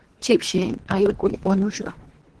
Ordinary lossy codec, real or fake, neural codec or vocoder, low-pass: Opus, 16 kbps; fake; codec, 24 kHz, 3 kbps, HILCodec; 10.8 kHz